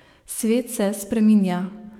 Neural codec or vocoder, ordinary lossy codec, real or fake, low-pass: codec, 44.1 kHz, 7.8 kbps, DAC; none; fake; 19.8 kHz